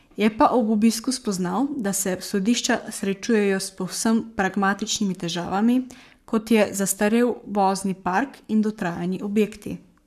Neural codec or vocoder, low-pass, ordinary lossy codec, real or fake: codec, 44.1 kHz, 7.8 kbps, Pupu-Codec; 14.4 kHz; none; fake